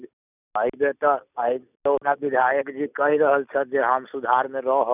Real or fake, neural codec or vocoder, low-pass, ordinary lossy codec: real; none; 3.6 kHz; none